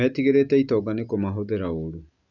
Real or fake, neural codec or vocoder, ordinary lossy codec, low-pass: real; none; none; 7.2 kHz